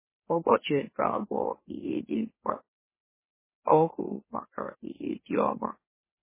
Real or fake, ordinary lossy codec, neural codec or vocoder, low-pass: fake; MP3, 16 kbps; autoencoder, 44.1 kHz, a latent of 192 numbers a frame, MeloTTS; 3.6 kHz